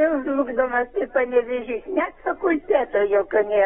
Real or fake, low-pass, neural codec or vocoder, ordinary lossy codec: fake; 19.8 kHz; codec, 44.1 kHz, 7.8 kbps, Pupu-Codec; AAC, 16 kbps